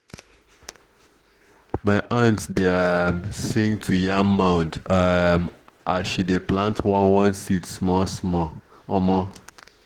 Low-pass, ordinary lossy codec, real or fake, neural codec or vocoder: 19.8 kHz; Opus, 16 kbps; fake; autoencoder, 48 kHz, 32 numbers a frame, DAC-VAE, trained on Japanese speech